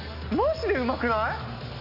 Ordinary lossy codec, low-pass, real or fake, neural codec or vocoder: none; 5.4 kHz; real; none